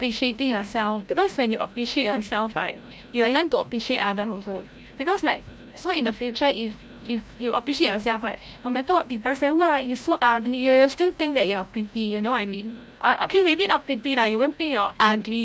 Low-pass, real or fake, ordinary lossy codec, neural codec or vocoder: none; fake; none; codec, 16 kHz, 0.5 kbps, FreqCodec, larger model